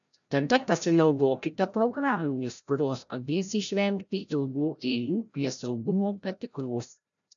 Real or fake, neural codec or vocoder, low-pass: fake; codec, 16 kHz, 0.5 kbps, FreqCodec, larger model; 7.2 kHz